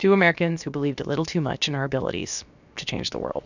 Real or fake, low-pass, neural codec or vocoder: fake; 7.2 kHz; codec, 16 kHz, about 1 kbps, DyCAST, with the encoder's durations